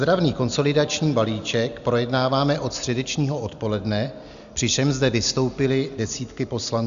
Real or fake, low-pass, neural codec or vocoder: real; 7.2 kHz; none